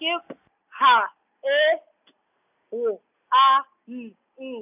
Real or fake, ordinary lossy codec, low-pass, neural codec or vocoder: real; none; 3.6 kHz; none